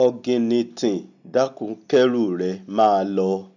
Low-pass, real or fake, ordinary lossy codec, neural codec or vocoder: 7.2 kHz; real; none; none